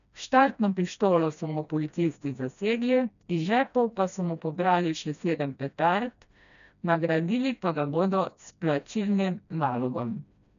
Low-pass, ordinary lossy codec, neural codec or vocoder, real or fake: 7.2 kHz; none; codec, 16 kHz, 1 kbps, FreqCodec, smaller model; fake